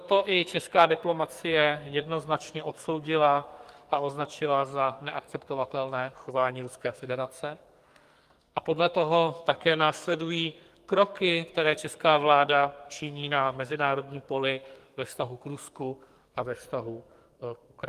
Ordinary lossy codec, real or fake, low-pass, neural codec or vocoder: Opus, 32 kbps; fake; 14.4 kHz; codec, 44.1 kHz, 2.6 kbps, SNAC